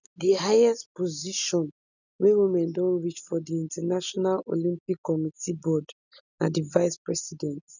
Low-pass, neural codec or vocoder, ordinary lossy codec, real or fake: 7.2 kHz; none; none; real